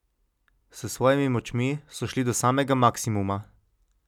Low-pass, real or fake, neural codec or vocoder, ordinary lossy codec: 19.8 kHz; real; none; none